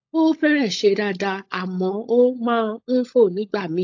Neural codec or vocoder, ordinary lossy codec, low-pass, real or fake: codec, 16 kHz, 16 kbps, FunCodec, trained on LibriTTS, 50 frames a second; AAC, 48 kbps; 7.2 kHz; fake